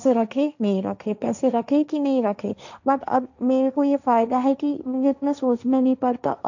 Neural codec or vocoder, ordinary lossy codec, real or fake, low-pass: codec, 16 kHz, 1.1 kbps, Voila-Tokenizer; none; fake; 7.2 kHz